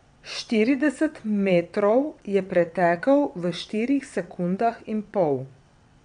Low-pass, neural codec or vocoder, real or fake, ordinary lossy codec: 9.9 kHz; vocoder, 22.05 kHz, 80 mel bands, WaveNeXt; fake; MP3, 96 kbps